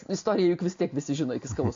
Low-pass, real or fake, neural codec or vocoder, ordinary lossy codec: 7.2 kHz; real; none; AAC, 48 kbps